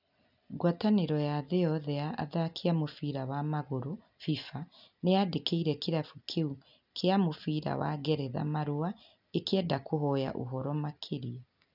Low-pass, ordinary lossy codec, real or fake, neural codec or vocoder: 5.4 kHz; none; real; none